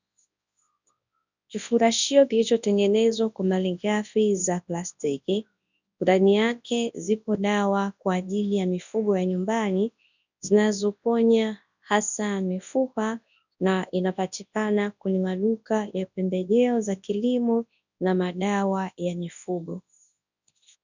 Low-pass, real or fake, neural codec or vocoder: 7.2 kHz; fake; codec, 24 kHz, 0.9 kbps, WavTokenizer, large speech release